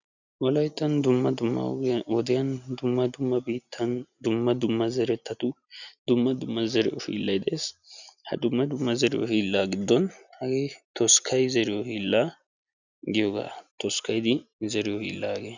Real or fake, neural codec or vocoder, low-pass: real; none; 7.2 kHz